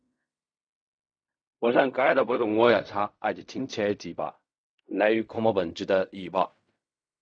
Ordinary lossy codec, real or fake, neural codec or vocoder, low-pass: none; fake; codec, 16 kHz in and 24 kHz out, 0.4 kbps, LongCat-Audio-Codec, fine tuned four codebook decoder; 9.9 kHz